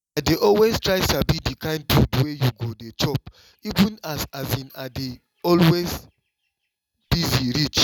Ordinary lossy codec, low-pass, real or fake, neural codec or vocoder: none; 19.8 kHz; real; none